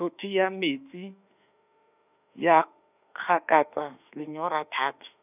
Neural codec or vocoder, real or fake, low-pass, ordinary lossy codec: autoencoder, 48 kHz, 32 numbers a frame, DAC-VAE, trained on Japanese speech; fake; 3.6 kHz; none